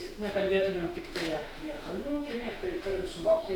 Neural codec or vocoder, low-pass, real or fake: autoencoder, 48 kHz, 32 numbers a frame, DAC-VAE, trained on Japanese speech; 19.8 kHz; fake